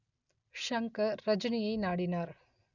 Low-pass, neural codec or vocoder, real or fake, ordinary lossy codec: 7.2 kHz; none; real; none